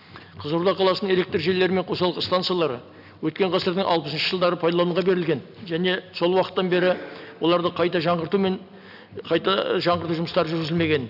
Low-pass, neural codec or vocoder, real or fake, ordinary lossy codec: 5.4 kHz; none; real; none